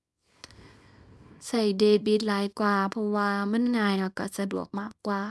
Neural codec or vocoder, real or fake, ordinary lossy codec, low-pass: codec, 24 kHz, 0.9 kbps, WavTokenizer, small release; fake; none; none